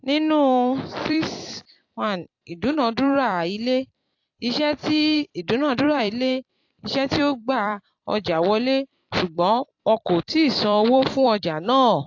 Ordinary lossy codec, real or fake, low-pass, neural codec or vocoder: AAC, 48 kbps; fake; 7.2 kHz; vocoder, 44.1 kHz, 128 mel bands every 256 samples, BigVGAN v2